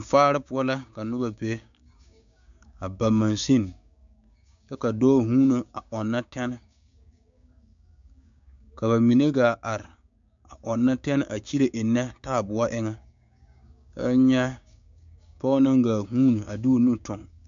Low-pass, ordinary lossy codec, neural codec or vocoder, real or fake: 7.2 kHz; MP3, 64 kbps; codec, 16 kHz, 6 kbps, DAC; fake